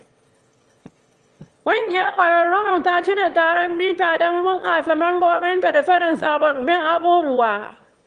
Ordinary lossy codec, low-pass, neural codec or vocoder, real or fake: Opus, 24 kbps; 9.9 kHz; autoencoder, 22.05 kHz, a latent of 192 numbers a frame, VITS, trained on one speaker; fake